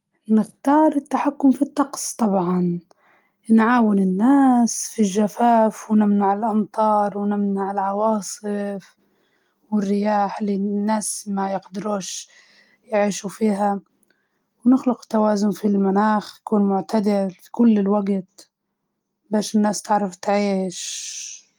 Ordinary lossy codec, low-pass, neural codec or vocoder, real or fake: Opus, 32 kbps; 19.8 kHz; none; real